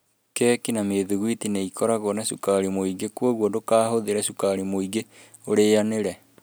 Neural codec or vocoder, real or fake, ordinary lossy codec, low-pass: none; real; none; none